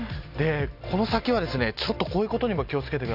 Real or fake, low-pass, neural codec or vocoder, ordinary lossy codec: real; 5.4 kHz; none; none